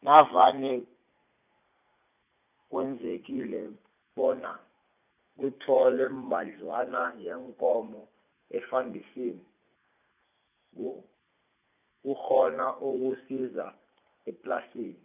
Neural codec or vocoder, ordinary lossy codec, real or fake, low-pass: vocoder, 44.1 kHz, 80 mel bands, Vocos; none; fake; 3.6 kHz